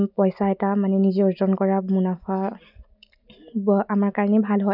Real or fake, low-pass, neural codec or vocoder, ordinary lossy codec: real; 5.4 kHz; none; none